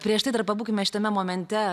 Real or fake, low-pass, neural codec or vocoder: real; 14.4 kHz; none